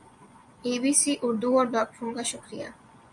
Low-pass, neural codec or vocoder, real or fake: 10.8 kHz; vocoder, 24 kHz, 100 mel bands, Vocos; fake